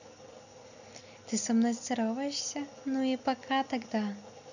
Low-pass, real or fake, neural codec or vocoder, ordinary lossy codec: 7.2 kHz; real; none; none